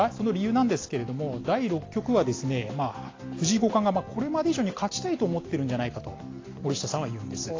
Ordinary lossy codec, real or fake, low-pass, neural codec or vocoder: AAC, 32 kbps; real; 7.2 kHz; none